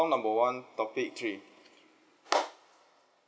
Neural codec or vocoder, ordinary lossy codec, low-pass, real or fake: none; none; none; real